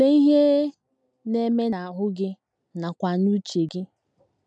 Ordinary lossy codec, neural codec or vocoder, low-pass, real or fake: none; none; none; real